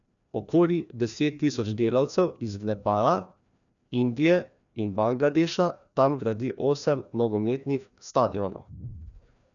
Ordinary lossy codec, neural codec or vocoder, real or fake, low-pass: none; codec, 16 kHz, 1 kbps, FreqCodec, larger model; fake; 7.2 kHz